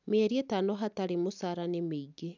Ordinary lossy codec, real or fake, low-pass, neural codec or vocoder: none; real; 7.2 kHz; none